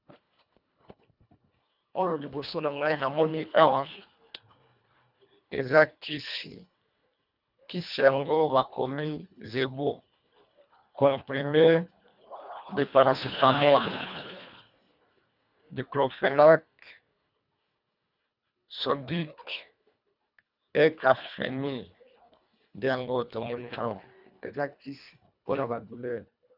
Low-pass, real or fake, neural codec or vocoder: 5.4 kHz; fake; codec, 24 kHz, 1.5 kbps, HILCodec